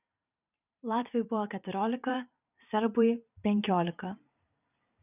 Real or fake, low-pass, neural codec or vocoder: fake; 3.6 kHz; vocoder, 44.1 kHz, 128 mel bands every 512 samples, BigVGAN v2